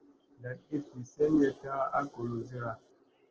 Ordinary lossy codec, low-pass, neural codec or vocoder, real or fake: Opus, 16 kbps; 7.2 kHz; none; real